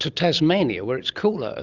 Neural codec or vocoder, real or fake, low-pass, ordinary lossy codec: none; real; 7.2 kHz; Opus, 32 kbps